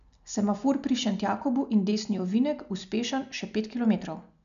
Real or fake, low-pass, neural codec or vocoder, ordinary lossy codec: real; 7.2 kHz; none; none